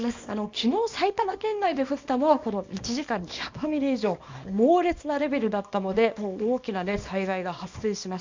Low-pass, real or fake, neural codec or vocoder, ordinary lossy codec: 7.2 kHz; fake; codec, 24 kHz, 0.9 kbps, WavTokenizer, small release; MP3, 64 kbps